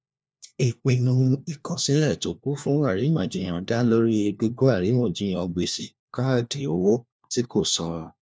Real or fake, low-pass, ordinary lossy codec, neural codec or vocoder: fake; none; none; codec, 16 kHz, 1 kbps, FunCodec, trained on LibriTTS, 50 frames a second